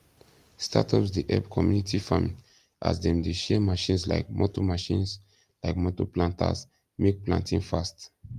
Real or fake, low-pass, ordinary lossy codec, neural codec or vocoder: real; 14.4 kHz; Opus, 32 kbps; none